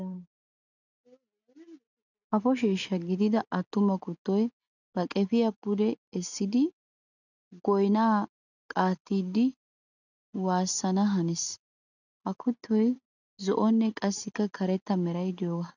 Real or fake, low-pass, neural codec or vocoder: real; 7.2 kHz; none